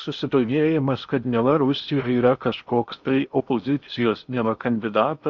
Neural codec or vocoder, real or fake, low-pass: codec, 16 kHz in and 24 kHz out, 0.8 kbps, FocalCodec, streaming, 65536 codes; fake; 7.2 kHz